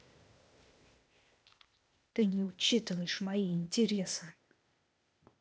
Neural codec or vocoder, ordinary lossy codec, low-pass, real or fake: codec, 16 kHz, 0.8 kbps, ZipCodec; none; none; fake